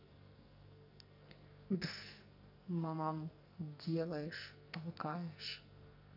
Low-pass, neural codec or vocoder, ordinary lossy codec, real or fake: 5.4 kHz; codec, 44.1 kHz, 2.6 kbps, SNAC; none; fake